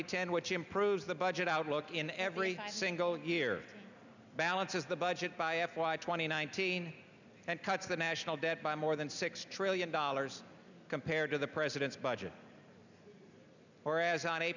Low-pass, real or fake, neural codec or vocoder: 7.2 kHz; real; none